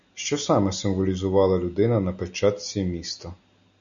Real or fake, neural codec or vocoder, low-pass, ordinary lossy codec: real; none; 7.2 kHz; MP3, 96 kbps